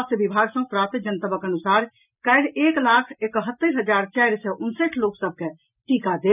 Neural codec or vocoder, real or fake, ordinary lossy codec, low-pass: none; real; none; 3.6 kHz